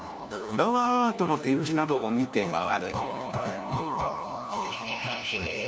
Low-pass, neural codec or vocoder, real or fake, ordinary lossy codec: none; codec, 16 kHz, 1 kbps, FunCodec, trained on LibriTTS, 50 frames a second; fake; none